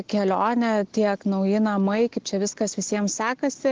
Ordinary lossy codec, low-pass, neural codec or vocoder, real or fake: Opus, 16 kbps; 7.2 kHz; none; real